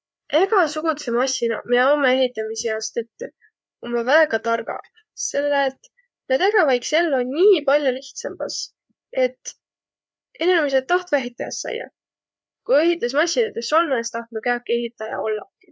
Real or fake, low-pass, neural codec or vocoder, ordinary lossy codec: fake; none; codec, 16 kHz, 4 kbps, FreqCodec, larger model; none